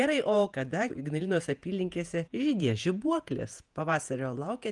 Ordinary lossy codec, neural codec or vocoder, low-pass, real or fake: Opus, 32 kbps; vocoder, 48 kHz, 128 mel bands, Vocos; 10.8 kHz; fake